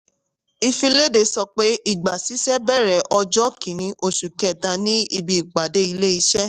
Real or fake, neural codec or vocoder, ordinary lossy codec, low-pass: fake; codec, 44.1 kHz, 7.8 kbps, DAC; Opus, 64 kbps; 14.4 kHz